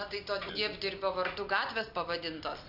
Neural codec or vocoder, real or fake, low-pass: none; real; 5.4 kHz